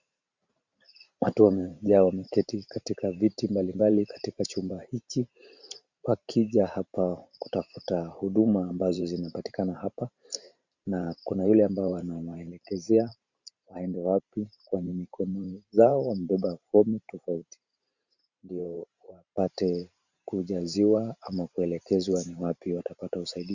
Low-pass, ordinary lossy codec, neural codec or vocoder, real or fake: 7.2 kHz; Opus, 64 kbps; vocoder, 44.1 kHz, 128 mel bands every 512 samples, BigVGAN v2; fake